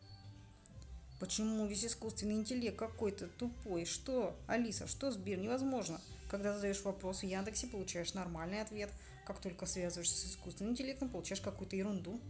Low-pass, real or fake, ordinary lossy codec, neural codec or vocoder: none; real; none; none